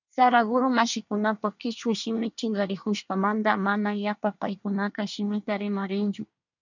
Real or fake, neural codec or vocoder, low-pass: fake; codec, 24 kHz, 1 kbps, SNAC; 7.2 kHz